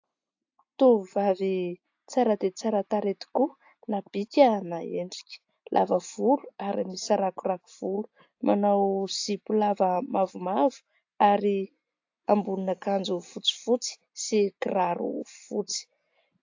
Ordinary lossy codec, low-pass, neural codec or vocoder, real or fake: AAC, 48 kbps; 7.2 kHz; none; real